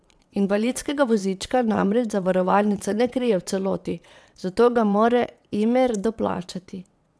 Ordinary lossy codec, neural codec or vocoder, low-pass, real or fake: none; vocoder, 22.05 kHz, 80 mel bands, WaveNeXt; none; fake